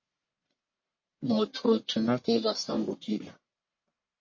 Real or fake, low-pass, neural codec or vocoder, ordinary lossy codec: fake; 7.2 kHz; codec, 44.1 kHz, 1.7 kbps, Pupu-Codec; MP3, 32 kbps